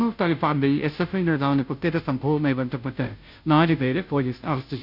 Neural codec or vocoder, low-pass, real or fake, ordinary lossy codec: codec, 16 kHz, 0.5 kbps, FunCodec, trained on Chinese and English, 25 frames a second; 5.4 kHz; fake; none